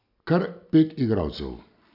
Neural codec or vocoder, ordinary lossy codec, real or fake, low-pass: none; none; real; 5.4 kHz